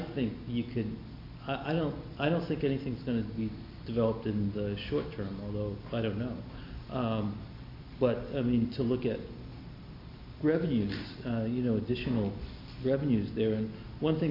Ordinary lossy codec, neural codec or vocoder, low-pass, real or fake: MP3, 32 kbps; none; 5.4 kHz; real